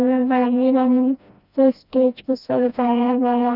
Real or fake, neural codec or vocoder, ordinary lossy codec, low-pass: fake; codec, 16 kHz, 1 kbps, FreqCodec, smaller model; none; 5.4 kHz